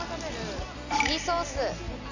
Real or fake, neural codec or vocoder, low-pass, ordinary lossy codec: real; none; 7.2 kHz; none